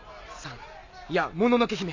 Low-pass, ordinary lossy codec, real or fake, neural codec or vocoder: 7.2 kHz; MP3, 64 kbps; real; none